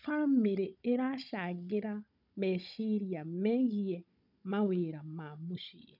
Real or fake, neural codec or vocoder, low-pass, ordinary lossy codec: fake; codec, 16 kHz, 16 kbps, FunCodec, trained on Chinese and English, 50 frames a second; 5.4 kHz; none